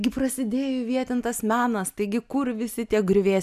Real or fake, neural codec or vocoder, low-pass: real; none; 14.4 kHz